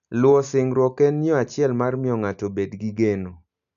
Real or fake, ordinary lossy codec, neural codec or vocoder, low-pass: real; none; none; 7.2 kHz